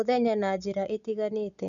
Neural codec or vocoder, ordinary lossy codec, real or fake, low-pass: none; none; real; 7.2 kHz